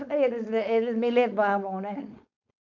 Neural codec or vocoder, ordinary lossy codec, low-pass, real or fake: codec, 16 kHz, 4.8 kbps, FACodec; none; 7.2 kHz; fake